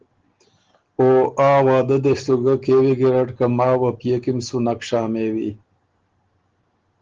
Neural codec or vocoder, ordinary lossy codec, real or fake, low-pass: none; Opus, 16 kbps; real; 7.2 kHz